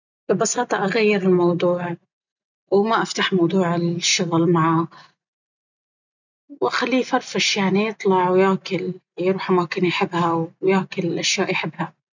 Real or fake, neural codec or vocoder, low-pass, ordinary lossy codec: real; none; 7.2 kHz; none